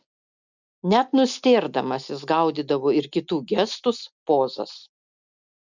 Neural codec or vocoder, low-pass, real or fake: none; 7.2 kHz; real